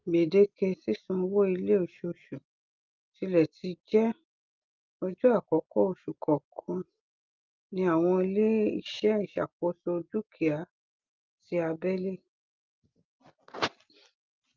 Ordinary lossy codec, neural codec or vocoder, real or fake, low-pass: Opus, 24 kbps; none; real; 7.2 kHz